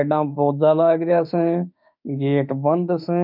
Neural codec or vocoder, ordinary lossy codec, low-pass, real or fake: autoencoder, 48 kHz, 32 numbers a frame, DAC-VAE, trained on Japanese speech; none; 5.4 kHz; fake